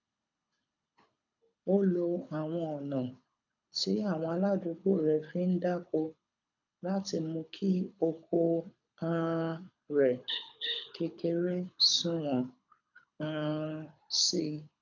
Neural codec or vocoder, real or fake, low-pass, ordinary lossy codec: codec, 24 kHz, 6 kbps, HILCodec; fake; 7.2 kHz; none